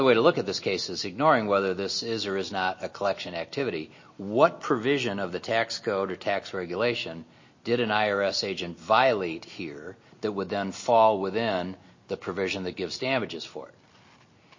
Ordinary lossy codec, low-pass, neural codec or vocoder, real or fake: MP3, 32 kbps; 7.2 kHz; none; real